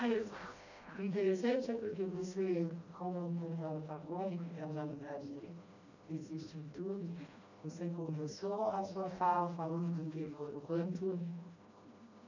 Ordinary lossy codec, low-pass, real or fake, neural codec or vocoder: none; 7.2 kHz; fake; codec, 16 kHz, 1 kbps, FreqCodec, smaller model